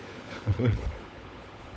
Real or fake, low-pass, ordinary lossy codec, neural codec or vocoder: fake; none; none; codec, 16 kHz, 16 kbps, FunCodec, trained on LibriTTS, 50 frames a second